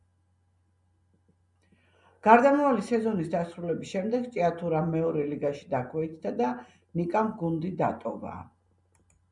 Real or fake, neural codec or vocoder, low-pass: real; none; 10.8 kHz